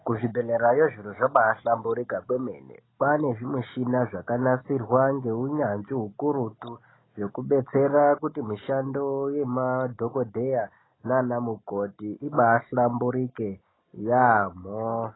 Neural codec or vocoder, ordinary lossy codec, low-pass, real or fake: none; AAC, 16 kbps; 7.2 kHz; real